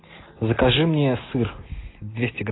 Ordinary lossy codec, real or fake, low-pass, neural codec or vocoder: AAC, 16 kbps; real; 7.2 kHz; none